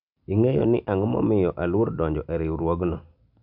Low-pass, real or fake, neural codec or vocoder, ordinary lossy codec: 5.4 kHz; real; none; MP3, 48 kbps